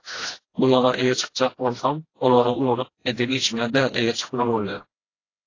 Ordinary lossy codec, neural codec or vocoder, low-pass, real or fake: AAC, 32 kbps; codec, 16 kHz, 1 kbps, FreqCodec, smaller model; 7.2 kHz; fake